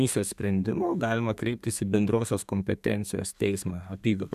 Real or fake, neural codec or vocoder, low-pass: fake; codec, 32 kHz, 1.9 kbps, SNAC; 14.4 kHz